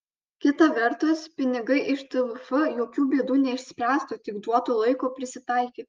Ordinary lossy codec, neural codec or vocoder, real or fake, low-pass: Opus, 24 kbps; none; real; 7.2 kHz